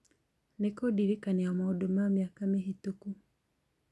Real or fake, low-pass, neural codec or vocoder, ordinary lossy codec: real; none; none; none